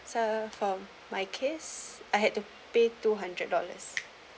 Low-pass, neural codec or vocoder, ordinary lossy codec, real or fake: none; none; none; real